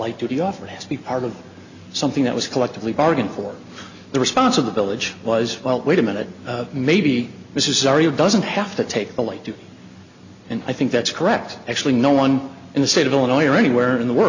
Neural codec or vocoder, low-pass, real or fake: none; 7.2 kHz; real